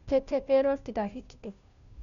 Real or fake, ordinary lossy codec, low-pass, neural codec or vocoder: fake; none; 7.2 kHz; codec, 16 kHz, 0.5 kbps, FunCodec, trained on Chinese and English, 25 frames a second